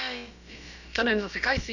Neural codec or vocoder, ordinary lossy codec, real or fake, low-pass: codec, 16 kHz, about 1 kbps, DyCAST, with the encoder's durations; none; fake; 7.2 kHz